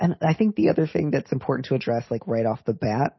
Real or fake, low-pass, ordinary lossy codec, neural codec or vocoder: real; 7.2 kHz; MP3, 24 kbps; none